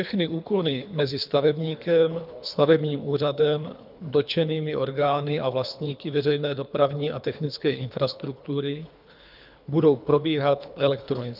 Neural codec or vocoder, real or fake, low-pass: codec, 24 kHz, 3 kbps, HILCodec; fake; 5.4 kHz